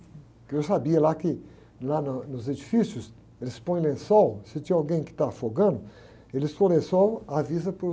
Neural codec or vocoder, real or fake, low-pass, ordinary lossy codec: none; real; none; none